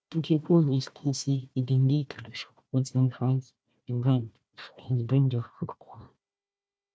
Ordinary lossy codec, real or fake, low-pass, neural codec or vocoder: none; fake; none; codec, 16 kHz, 1 kbps, FunCodec, trained on Chinese and English, 50 frames a second